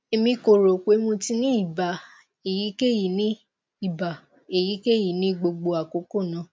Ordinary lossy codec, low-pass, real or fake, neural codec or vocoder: none; none; real; none